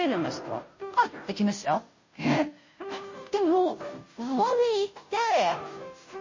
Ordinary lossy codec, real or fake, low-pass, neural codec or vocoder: MP3, 32 kbps; fake; 7.2 kHz; codec, 16 kHz, 0.5 kbps, FunCodec, trained on Chinese and English, 25 frames a second